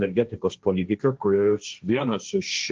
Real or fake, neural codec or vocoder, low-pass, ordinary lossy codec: fake; codec, 16 kHz, 1.1 kbps, Voila-Tokenizer; 7.2 kHz; Opus, 24 kbps